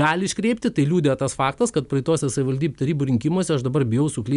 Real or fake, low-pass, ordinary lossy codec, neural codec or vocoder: real; 10.8 kHz; MP3, 96 kbps; none